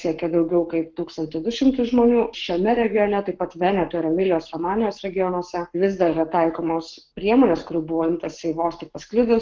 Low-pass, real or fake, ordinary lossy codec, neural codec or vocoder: 7.2 kHz; fake; Opus, 16 kbps; codec, 44.1 kHz, 7.8 kbps, Pupu-Codec